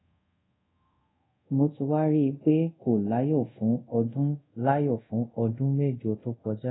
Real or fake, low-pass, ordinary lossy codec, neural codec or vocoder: fake; 7.2 kHz; AAC, 16 kbps; codec, 24 kHz, 0.5 kbps, DualCodec